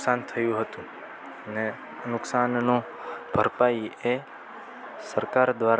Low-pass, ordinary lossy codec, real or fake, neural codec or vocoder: none; none; real; none